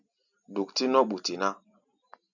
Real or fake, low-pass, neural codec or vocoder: real; 7.2 kHz; none